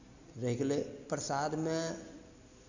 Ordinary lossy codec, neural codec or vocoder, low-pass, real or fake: none; none; 7.2 kHz; real